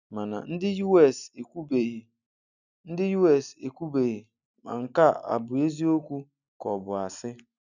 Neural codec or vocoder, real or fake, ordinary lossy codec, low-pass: none; real; none; 7.2 kHz